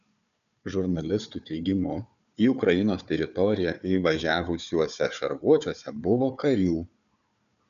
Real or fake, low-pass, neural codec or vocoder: fake; 7.2 kHz; codec, 16 kHz, 4 kbps, FunCodec, trained on Chinese and English, 50 frames a second